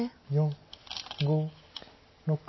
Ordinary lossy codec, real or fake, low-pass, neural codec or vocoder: MP3, 24 kbps; real; 7.2 kHz; none